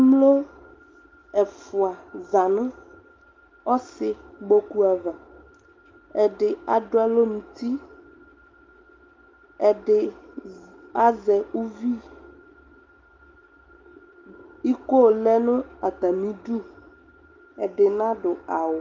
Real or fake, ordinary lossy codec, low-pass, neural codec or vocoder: real; Opus, 24 kbps; 7.2 kHz; none